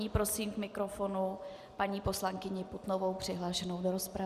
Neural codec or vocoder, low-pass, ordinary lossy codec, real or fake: none; 14.4 kHz; Opus, 64 kbps; real